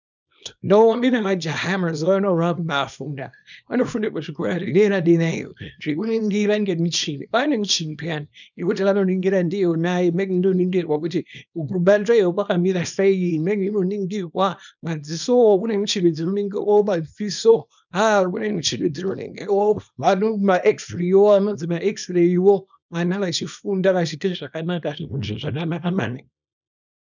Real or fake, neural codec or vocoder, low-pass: fake; codec, 24 kHz, 0.9 kbps, WavTokenizer, small release; 7.2 kHz